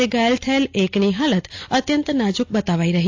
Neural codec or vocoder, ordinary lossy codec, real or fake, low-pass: none; AAC, 48 kbps; real; 7.2 kHz